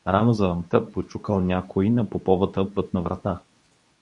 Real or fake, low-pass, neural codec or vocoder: fake; 10.8 kHz; codec, 24 kHz, 0.9 kbps, WavTokenizer, medium speech release version 2